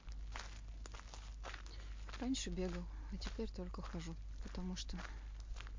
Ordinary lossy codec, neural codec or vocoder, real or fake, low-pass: MP3, 48 kbps; none; real; 7.2 kHz